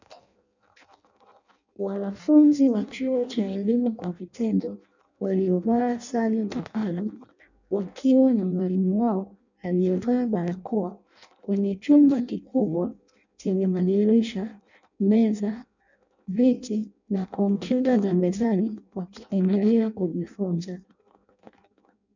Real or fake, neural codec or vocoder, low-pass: fake; codec, 16 kHz in and 24 kHz out, 0.6 kbps, FireRedTTS-2 codec; 7.2 kHz